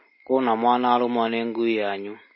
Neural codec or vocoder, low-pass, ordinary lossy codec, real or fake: none; 7.2 kHz; MP3, 24 kbps; real